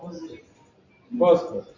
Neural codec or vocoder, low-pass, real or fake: none; 7.2 kHz; real